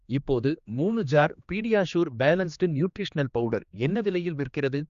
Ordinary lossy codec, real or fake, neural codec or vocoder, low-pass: Opus, 64 kbps; fake; codec, 16 kHz, 2 kbps, X-Codec, HuBERT features, trained on general audio; 7.2 kHz